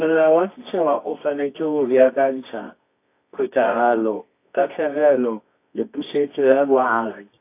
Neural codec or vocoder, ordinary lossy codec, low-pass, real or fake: codec, 24 kHz, 0.9 kbps, WavTokenizer, medium music audio release; AAC, 24 kbps; 3.6 kHz; fake